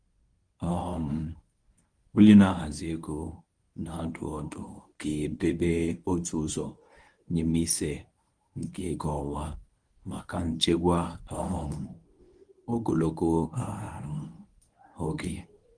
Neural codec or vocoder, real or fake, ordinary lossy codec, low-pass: codec, 24 kHz, 0.9 kbps, WavTokenizer, medium speech release version 1; fake; Opus, 24 kbps; 9.9 kHz